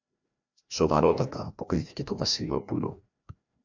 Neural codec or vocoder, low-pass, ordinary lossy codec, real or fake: codec, 16 kHz, 1 kbps, FreqCodec, larger model; 7.2 kHz; MP3, 64 kbps; fake